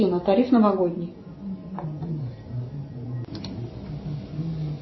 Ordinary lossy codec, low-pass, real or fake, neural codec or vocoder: MP3, 24 kbps; 7.2 kHz; real; none